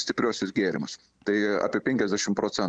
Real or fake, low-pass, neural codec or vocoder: real; 9.9 kHz; none